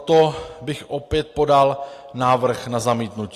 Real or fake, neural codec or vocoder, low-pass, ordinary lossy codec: real; none; 14.4 kHz; AAC, 48 kbps